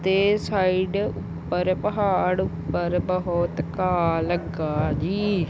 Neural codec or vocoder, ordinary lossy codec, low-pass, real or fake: none; none; none; real